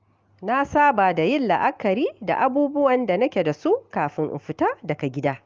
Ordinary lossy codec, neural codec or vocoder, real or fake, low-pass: Opus, 24 kbps; none; real; 7.2 kHz